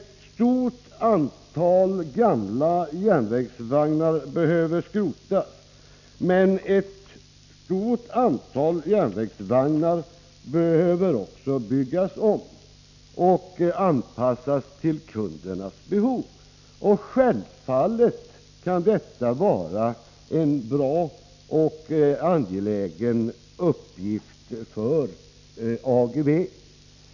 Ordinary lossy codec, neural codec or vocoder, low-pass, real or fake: none; none; 7.2 kHz; real